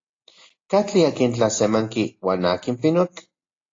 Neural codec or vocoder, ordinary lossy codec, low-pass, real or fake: none; AAC, 32 kbps; 7.2 kHz; real